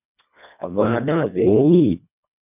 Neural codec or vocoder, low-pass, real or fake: codec, 24 kHz, 1.5 kbps, HILCodec; 3.6 kHz; fake